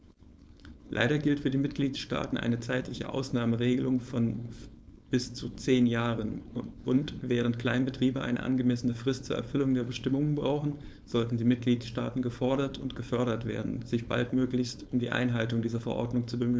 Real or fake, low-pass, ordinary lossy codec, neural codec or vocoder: fake; none; none; codec, 16 kHz, 4.8 kbps, FACodec